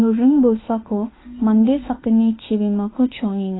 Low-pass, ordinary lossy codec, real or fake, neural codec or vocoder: 7.2 kHz; AAC, 16 kbps; fake; autoencoder, 48 kHz, 32 numbers a frame, DAC-VAE, trained on Japanese speech